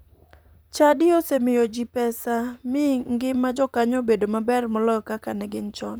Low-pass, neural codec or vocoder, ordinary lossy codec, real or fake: none; vocoder, 44.1 kHz, 128 mel bands, Pupu-Vocoder; none; fake